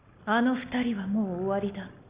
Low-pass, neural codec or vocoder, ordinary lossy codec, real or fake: 3.6 kHz; none; Opus, 64 kbps; real